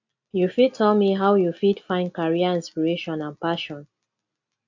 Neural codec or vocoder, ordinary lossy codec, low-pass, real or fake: none; AAC, 48 kbps; 7.2 kHz; real